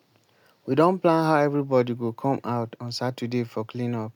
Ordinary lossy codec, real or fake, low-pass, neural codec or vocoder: none; real; 19.8 kHz; none